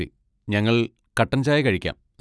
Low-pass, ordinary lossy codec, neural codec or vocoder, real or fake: none; none; none; real